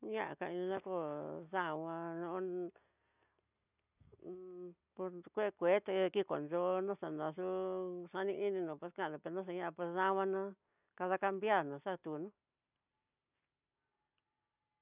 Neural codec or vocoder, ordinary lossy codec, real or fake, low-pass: none; none; real; 3.6 kHz